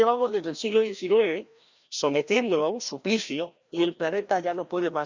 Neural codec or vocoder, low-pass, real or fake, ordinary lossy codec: codec, 16 kHz, 1 kbps, FreqCodec, larger model; 7.2 kHz; fake; Opus, 64 kbps